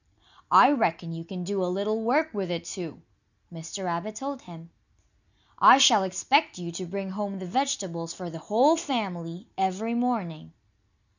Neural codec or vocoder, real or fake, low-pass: none; real; 7.2 kHz